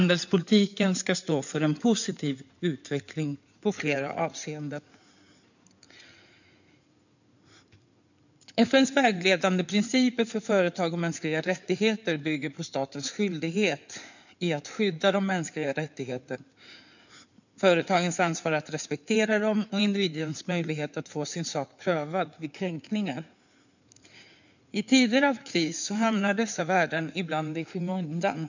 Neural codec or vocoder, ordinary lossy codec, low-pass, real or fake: codec, 16 kHz in and 24 kHz out, 2.2 kbps, FireRedTTS-2 codec; none; 7.2 kHz; fake